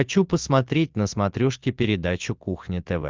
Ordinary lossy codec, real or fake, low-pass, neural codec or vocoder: Opus, 32 kbps; real; 7.2 kHz; none